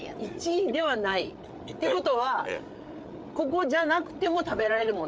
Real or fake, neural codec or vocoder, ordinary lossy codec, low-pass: fake; codec, 16 kHz, 8 kbps, FreqCodec, larger model; none; none